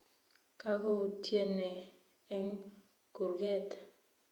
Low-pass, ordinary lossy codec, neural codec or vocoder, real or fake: 19.8 kHz; Opus, 64 kbps; vocoder, 48 kHz, 128 mel bands, Vocos; fake